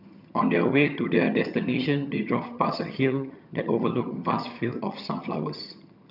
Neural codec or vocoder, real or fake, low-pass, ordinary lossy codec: vocoder, 22.05 kHz, 80 mel bands, HiFi-GAN; fake; 5.4 kHz; none